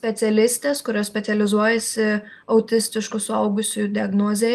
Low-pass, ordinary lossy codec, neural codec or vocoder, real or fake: 14.4 kHz; Opus, 32 kbps; none; real